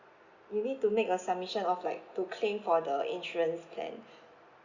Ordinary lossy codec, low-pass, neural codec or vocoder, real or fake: Opus, 64 kbps; 7.2 kHz; none; real